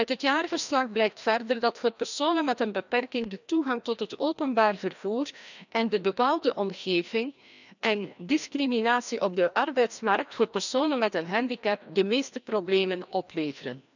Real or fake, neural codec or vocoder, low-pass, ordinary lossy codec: fake; codec, 16 kHz, 1 kbps, FreqCodec, larger model; 7.2 kHz; none